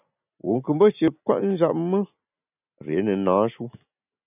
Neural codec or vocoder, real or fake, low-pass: none; real; 3.6 kHz